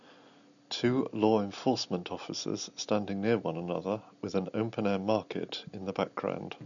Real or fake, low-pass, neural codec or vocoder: real; 7.2 kHz; none